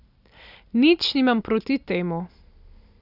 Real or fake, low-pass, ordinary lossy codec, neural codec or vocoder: real; 5.4 kHz; none; none